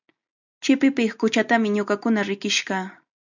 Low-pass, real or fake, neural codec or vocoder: 7.2 kHz; real; none